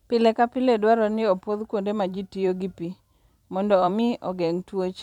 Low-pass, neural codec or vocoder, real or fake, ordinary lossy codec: 19.8 kHz; vocoder, 44.1 kHz, 128 mel bands every 512 samples, BigVGAN v2; fake; none